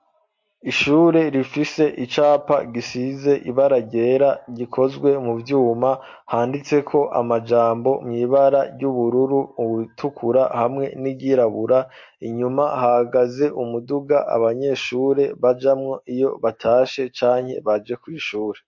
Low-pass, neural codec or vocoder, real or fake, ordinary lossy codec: 7.2 kHz; none; real; MP3, 48 kbps